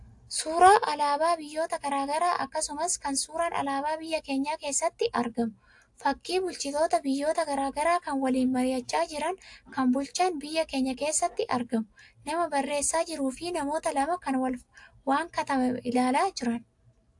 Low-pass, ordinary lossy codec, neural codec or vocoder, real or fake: 10.8 kHz; AAC, 64 kbps; none; real